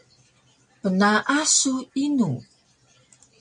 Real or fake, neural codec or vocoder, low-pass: real; none; 9.9 kHz